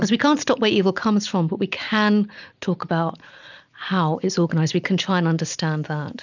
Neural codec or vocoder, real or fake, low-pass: none; real; 7.2 kHz